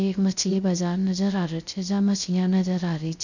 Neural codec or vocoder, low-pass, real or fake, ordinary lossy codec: codec, 16 kHz, 0.3 kbps, FocalCodec; 7.2 kHz; fake; none